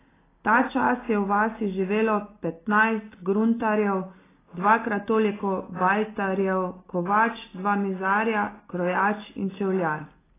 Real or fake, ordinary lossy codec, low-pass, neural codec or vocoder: real; AAC, 16 kbps; 3.6 kHz; none